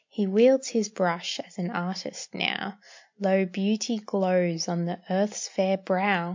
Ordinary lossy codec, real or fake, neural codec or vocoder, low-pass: MP3, 48 kbps; real; none; 7.2 kHz